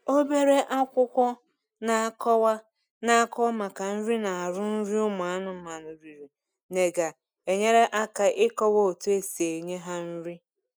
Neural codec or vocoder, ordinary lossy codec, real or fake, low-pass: none; none; real; none